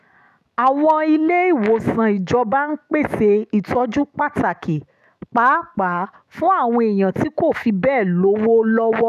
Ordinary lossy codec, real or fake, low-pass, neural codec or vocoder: none; fake; 14.4 kHz; autoencoder, 48 kHz, 128 numbers a frame, DAC-VAE, trained on Japanese speech